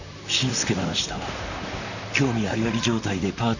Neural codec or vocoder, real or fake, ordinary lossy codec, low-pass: vocoder, 44.1 kHz, 128 mel bands, Pupu-Vocoder; fake; none; 7.2 kHz